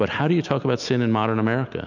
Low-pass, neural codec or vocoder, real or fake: 7.2 kHz; none; real